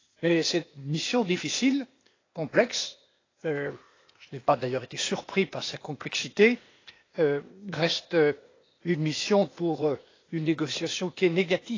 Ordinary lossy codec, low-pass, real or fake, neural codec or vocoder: AAC, 32 kbps; 7.2 kHz; fake; codec, 16 kHz, 0.8 kbps, ZipCodec